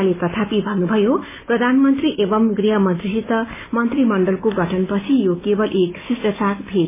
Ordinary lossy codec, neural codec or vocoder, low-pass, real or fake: MP3, 16 kbps; none; 3.6 kHz; real